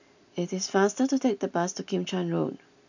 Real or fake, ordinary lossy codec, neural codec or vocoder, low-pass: real; none; none; 7.2 kHz